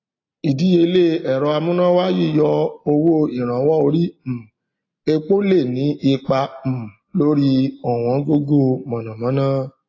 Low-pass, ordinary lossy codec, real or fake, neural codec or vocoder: 7.2 kHz; AAC, 32 kbps; real; none